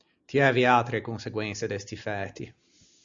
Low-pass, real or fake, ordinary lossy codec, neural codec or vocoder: 7.2 kHz; real; Opus, 64 kbps; none